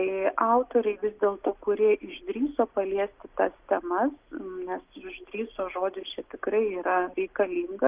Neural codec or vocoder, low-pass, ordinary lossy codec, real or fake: none; 3.6 kHz; Opus, 24 kbps; real